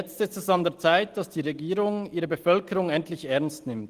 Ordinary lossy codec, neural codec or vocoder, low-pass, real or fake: Opus, 24 kbps; none; 14.4 kHz; real